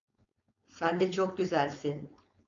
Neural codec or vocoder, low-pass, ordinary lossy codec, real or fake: codec, 16 kHz, 4.8 kbps, FACodec; 7.2 kHz; AAC, 48 kbps; fake